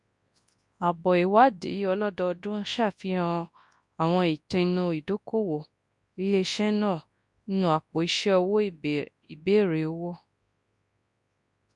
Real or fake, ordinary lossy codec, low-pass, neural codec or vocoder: fake; MP3, 64 kbps; 10.8 kHz; codec, 24 kHz, 0.9 kbps, WavTokenizer, large speech release